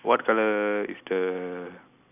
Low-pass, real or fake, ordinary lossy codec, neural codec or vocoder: 3.6 kHz; real; none; none